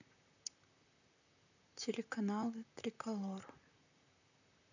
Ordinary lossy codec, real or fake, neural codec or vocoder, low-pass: none; fake; vocoder, 44.1 kHz, 128 mel bands, Pupu-Vocoder; 7.2 kHz